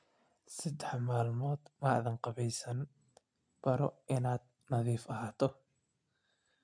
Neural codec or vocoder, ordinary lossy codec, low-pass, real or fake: none; MP3, 64 kbps; 9.9 kHz; real